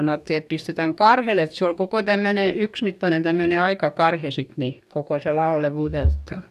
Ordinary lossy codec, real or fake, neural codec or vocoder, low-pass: none; fake; codec, 44.1 kHz, 2.6 kbps, DAC; 14.4 kHz